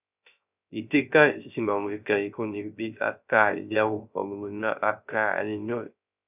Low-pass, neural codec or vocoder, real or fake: 3.6 kHz; codec, 16 kHz, 0.3 kbps, FocalCodec; fake